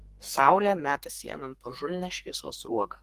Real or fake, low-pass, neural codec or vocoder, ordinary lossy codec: fake; 14.4 kHz; codec, 32 kHz, 1.9 kbps, SNAC; Opus, 24 kbps